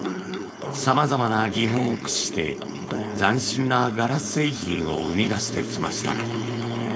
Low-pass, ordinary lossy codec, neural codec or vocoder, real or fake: none; none; codec, 16 kHz, 4.8 kbps, FACodec; fake